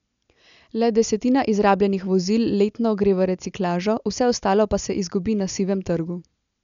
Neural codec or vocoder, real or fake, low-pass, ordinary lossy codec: none; real; 7.2 kHz; none